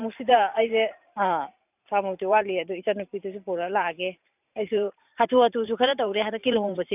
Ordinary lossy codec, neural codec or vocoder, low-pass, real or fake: none; vocoder, 44.1 kHz, 128 mel bands every 512 samples, BigVGAN v2; 3.6 kHz; fake